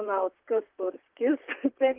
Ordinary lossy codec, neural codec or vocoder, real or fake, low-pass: Opus, 32 kbps; vocoder, 44.1 kHz, 128 mel bands, Pupu-Vocoder; fake; 3.6 kHz